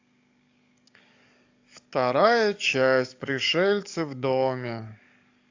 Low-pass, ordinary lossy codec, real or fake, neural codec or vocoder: 7.2 kHz; AAC, 48 kbps; fake; codec, 44.1 kHz, 7.8 kbps, DAC